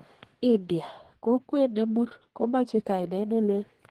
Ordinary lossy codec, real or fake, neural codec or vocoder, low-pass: Opus, 16 kbps; fake; codec, 32 kHz, 1.9 kbps, SNAC; 14.4 kHz